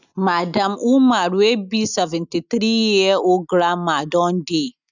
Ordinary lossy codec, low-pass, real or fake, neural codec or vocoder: none; 7.2 kHz; real; none